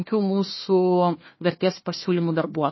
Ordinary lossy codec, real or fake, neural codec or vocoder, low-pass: MP3, 24 kbps; fake; codec, 16 kHz, 1 kbps, FunCodec, trained on Chinese and English, 50 frames a second; 7.2 kHz